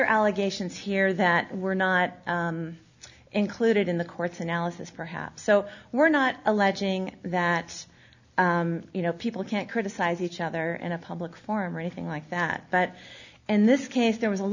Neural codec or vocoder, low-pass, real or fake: none; 7.2 kHz; real